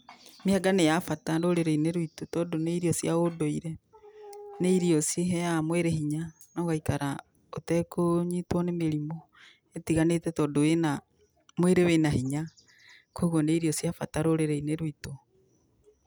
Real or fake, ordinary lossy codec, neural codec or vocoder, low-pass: real; none; none; none